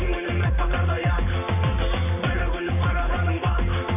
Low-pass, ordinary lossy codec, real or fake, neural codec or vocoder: 3.6 kHz; none; fake; vocoder, 44.1 kHz, 128 mel bands every 512 samples, BigVGAN v2